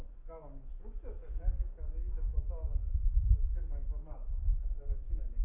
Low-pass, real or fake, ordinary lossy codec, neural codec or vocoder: 3.6 kHz; fake; AAC, 24 kbps; codec, 44.1 kHz, 7.8 kbps, DAC